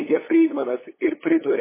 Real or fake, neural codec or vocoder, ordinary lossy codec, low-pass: fake; codec, 16 kHz, 8 kbps, FunCodec, trained on LibriTTS, 25 frames a second; MP3, 16 kbps; 3.6 kHz